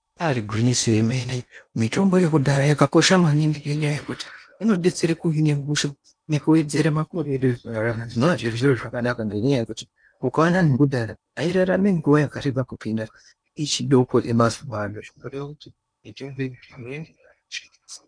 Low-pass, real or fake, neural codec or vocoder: 9.9 kHz; fake; codec, 16 kHz in and 24 kHz out, 0.8 kbps, FocalCodec, streaming, 65536 codes